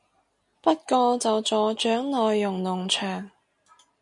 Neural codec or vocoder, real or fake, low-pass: none; real; 10.8 kHz